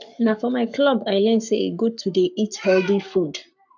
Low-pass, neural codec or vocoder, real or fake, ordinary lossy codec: 7.2 kHz; codec, 44.1 kHz, 7.8 kbps, Pupu-Codec; fake; none